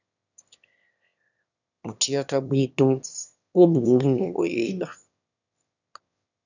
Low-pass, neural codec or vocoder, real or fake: 7.2 kHz; autoencoder, 22.05 kHz, a latent of 192 numbers a frame, VITS, trained on one speaker; fake